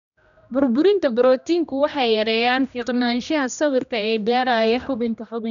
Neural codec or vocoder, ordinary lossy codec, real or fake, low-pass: codec, 16 kHz, 1 kbps, X-Codec, HuBERT features, trained on general audio; none; fake; 7.2 kHz